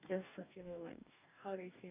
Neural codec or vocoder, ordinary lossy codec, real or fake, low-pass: codec, 44.1 kHz, 2.6 kbps, DAC; none; fake; 3.6 kHz